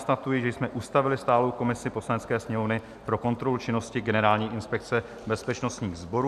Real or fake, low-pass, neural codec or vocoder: real; 14.4 kHz; none